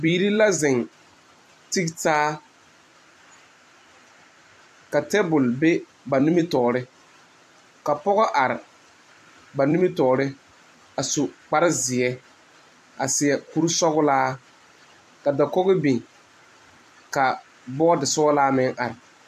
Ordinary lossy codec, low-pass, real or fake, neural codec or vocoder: AAC, 96 kbps; 14.4 kHz; fake; vocoder, 44.1 kHz, 128 mel bands every 256 samples, BigVGAN v2